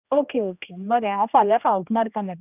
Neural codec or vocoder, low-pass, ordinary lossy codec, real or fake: codec, 16 kHz, 1 kbps, X-Codec, HuBERT features, trained on general audio; 3.6 kHz; none; fake